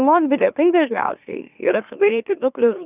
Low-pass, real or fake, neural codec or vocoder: 3.6 kHz; fake; autoencoder, 44.1 kHz, a latent of 192 numbers a frame, MeloTTS